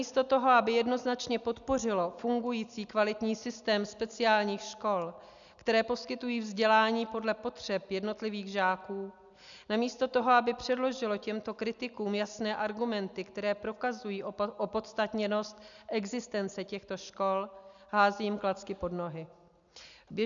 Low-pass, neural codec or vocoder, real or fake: 7.2 kHz; none; real